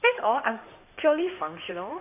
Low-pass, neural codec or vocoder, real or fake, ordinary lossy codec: 3.6 kHz; codec, 16 kHz in and 24 kHz out, 2.2 kbps, FireRedTTS-2 codec; fake; AAC, 32 kbps